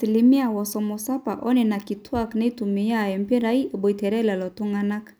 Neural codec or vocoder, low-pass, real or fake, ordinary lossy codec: none; none; real; none